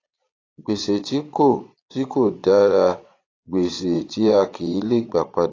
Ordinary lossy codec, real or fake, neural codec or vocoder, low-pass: AAC, 32 kbps; fake; vocoder, 22.05 kHz, 80 mel bands, Vocos; 7.2 kHz